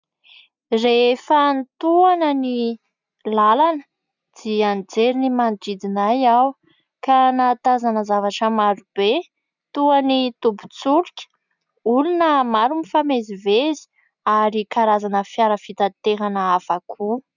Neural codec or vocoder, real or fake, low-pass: none; real; 7.2 kHz